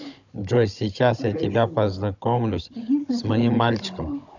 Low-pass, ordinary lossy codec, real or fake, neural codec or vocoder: 7.2 kHz; none; fake; codec, 16 kHz, 16 kbps, FunCodec, trained on Chinese and English, 50 frames a second